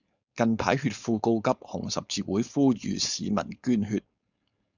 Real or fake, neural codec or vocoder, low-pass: fake; codec, 16 kHz, 4.8 kbps, FACodec; 7.2 kHz